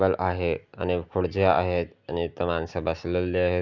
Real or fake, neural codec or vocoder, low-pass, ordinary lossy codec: real; none; 7.2 kHz; none